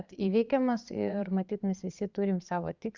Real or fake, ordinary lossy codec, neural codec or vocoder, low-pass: fake; Opus, 64 kbps; vocoder, 22.05 kHz, 80 mel bands, Vocos; 7.2 kHz